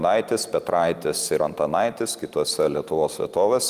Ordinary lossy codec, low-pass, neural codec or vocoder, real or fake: Opus, 32 kbps; 14.4 kHz; none; real